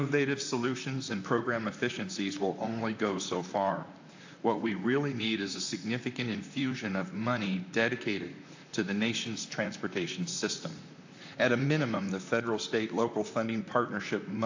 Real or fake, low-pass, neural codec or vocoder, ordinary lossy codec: fake; 7.2 kHz; vocoder, 44.1 kHz, 128 mel bands, Pupu-Vocoder; AAC, 48 kbps